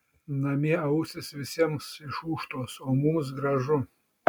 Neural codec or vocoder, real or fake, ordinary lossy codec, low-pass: none; real; MP3, 96 kbps; 19.8 kHz